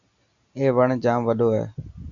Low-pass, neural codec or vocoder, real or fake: 7.2 kHz; none; real